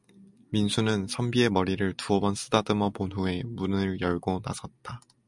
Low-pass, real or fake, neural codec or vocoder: 10.8 kHz; real; none